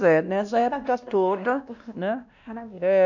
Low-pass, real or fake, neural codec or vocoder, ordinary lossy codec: 7.2 kHz; fake; codec, 16 kHz, 1 kbps, X-Codec, WavLM features, trained on Multilingual LibriSpeech; none